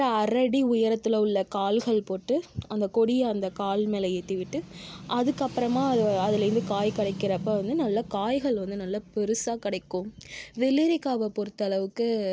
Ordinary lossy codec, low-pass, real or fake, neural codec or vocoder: none; none; real; none